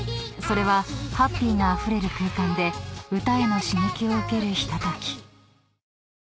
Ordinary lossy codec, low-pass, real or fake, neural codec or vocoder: none; none; real; none